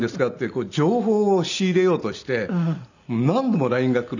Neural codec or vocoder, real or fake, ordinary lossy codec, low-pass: none; real; none; 7.2 kHz